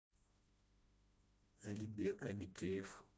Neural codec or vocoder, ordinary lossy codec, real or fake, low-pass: codec, 16 kHz, 1 kbps, FreqCodec, smaller model; none; fake; none